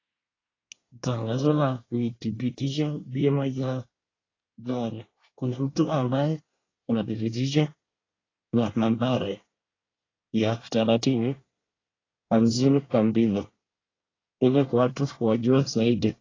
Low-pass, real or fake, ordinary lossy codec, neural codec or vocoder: 7.2 kHz; fake; AAC, 32 kbps; codec, 24 kHz, 1 kbps, SNAC